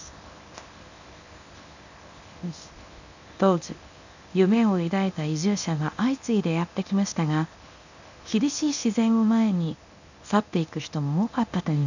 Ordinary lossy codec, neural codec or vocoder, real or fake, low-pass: none; codec, 24 kHz, 0.9 kbps, WavTokenizer, medium speech release version 1; fake; 7.2 kHz